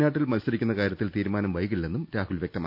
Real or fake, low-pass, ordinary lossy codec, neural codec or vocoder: real; 5.4 kHz; none; none